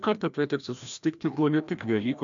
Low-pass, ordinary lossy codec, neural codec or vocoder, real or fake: 7.2 kHz; MP3, 64 kbps; codec, 16 kHz, 1 kbps, FreqCodec, larger model; fake